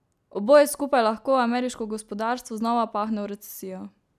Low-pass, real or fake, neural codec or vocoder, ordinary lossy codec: 14.4 kHz; real; none; none